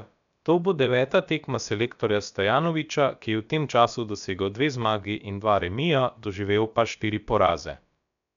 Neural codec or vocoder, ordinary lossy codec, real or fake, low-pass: codec, 16 kHz, about 1 kbps, DyCAST, with the encoder's durations; none; fake; 7.2 kHz